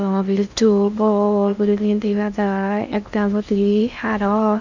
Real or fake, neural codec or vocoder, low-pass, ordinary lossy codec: fake; codec, 16 kHz in and 24 kHz out, 0.6 kbps, FocalCodec, streaming, 2048 codes; 7.2 kHz; none